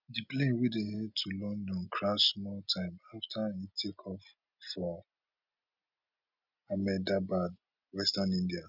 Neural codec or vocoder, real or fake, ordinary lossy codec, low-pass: none; real; none; 5.4 kHz